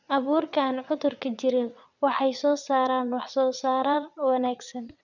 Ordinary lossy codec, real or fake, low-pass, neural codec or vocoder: none; fake; 7.2 kHz; vocoder, 22.05 kHz, 80 mel bands, WaveNeXt